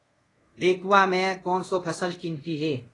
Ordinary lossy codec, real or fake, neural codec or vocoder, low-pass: AAC, 32 kbps; fake; codec, 24 kHz, 0.5 kbps, DualCodec; 10.8 kHz